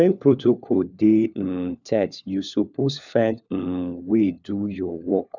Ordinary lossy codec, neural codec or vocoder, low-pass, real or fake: none; codec, 16 kHz, 4 kbps, FunCodec, trained on LibriTTS, 50 frames a second; 7.2 kHz; fake